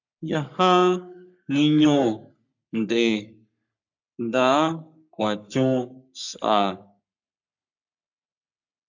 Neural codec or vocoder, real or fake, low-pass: codec, 44.1 kHz, 3.4 kbps, Pupu-Codec; fake; 7.2 kHz